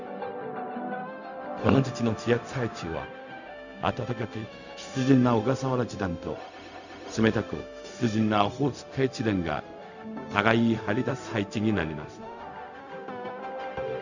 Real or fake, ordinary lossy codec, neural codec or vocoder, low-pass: fake; none; codec, 16 kHz, 0.4 kbps, LongCat-Audio-Codec; 7.2 kHz